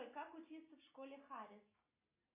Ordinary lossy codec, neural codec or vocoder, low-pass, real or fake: MP3, 24 kbps; none; 3.6 kHz; real